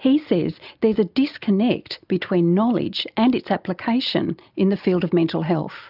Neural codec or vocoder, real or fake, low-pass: none; real; 5.4 kHz